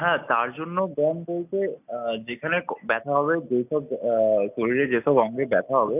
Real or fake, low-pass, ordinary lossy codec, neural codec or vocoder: real; 3.6 kHz; none; none